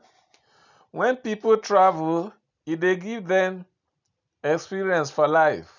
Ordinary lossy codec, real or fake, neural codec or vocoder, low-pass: none; real; none; 7.2 kHz